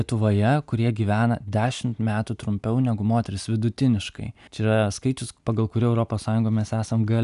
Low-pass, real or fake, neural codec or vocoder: 10.8 kHz; real; none